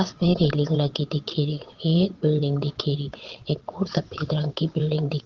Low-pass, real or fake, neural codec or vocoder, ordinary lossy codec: 7.2 kHz; fake; vocoder, 22.05 kHz, 80 mel bands, WaveNeXt; Opus, 16 kbps